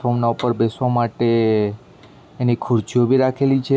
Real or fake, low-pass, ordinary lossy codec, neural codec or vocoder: real; none; none; none